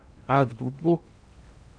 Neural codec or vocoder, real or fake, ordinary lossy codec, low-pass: codec, 16 kHz in and 24 kHz out, 0.6 kbps, FocalCodec, streaming, 2048 codes; fake; MP3, 96 kbps; 9.9 kHz